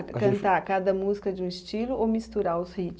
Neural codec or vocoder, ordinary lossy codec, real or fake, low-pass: none; none; real; none